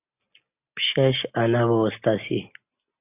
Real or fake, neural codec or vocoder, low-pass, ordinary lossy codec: real; none; 3.6 kHz; AAC, 24 kbps